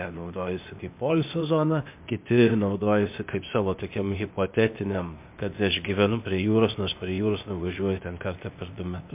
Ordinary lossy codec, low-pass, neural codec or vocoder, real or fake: MP3, 32 kbps; 3.6 kHz; codec, 16 kHz, 0.8 kbps, ZipCodec; fake